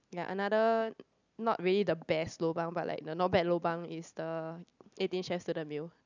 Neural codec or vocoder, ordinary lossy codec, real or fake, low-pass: none; none; real; 7.2 kHz